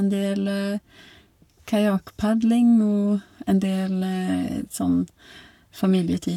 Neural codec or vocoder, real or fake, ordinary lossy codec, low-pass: codec, 44.1 kHz, 7.8 kbps, Pupu-Codec; fake; none; 19.8 kHz